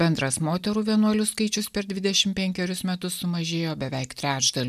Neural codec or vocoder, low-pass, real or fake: none; 14.4 kHz; real